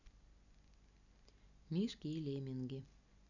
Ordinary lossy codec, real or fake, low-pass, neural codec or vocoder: none; real; 7.2 kHz; none